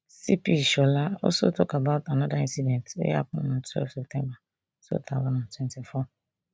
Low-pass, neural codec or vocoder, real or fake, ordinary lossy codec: none; none; real; none